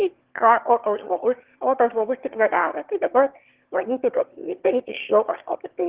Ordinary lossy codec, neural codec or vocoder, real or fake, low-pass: Opus, 16 kbps; autoencoder, 22.05 kHz, a latent of 192 numbers a frame, VITS, trained on one speaker; fake; 3.6 kHz